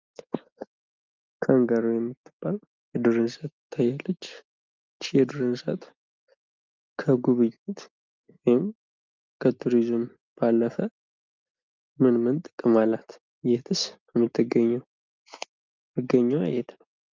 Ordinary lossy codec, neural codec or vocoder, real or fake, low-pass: Opus, 24 kbps; none; real; 7.2 kHz